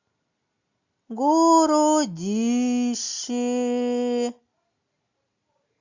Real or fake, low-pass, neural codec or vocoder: real; 7.2 kHz; none